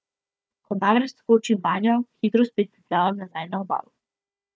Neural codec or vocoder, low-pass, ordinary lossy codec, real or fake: codec, 16 kHz, 4 kbps, FunCodec, trained on Chinese and English, 50 frames a second; none; none; fake